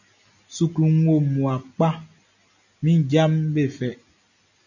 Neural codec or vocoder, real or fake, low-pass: none; real; 7.2 kHz